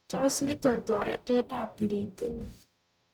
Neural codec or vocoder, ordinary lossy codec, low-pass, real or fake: codec, 44.1 kHz, 0.9 kbps, DAC; none; none; fake